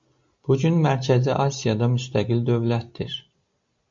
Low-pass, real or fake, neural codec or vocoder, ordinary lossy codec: 7.2 kHz; real; none; MP3, 48 kbps